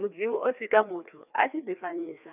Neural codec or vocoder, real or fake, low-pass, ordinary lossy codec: codec, 16 kHz, 2 kbps, FreqCodec, larger model; fake; 3.6 kHz; none